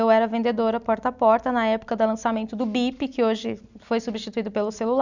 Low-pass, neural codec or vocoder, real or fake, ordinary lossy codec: 7.2 kHz; none; real; none